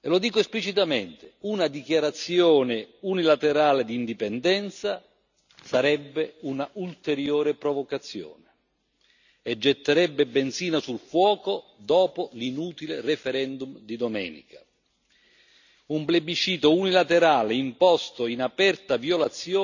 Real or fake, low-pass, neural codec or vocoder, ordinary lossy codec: real; 7.2 kHz; none; none